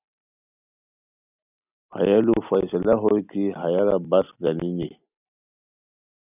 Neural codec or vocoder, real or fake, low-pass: none; real; 3.6 kHz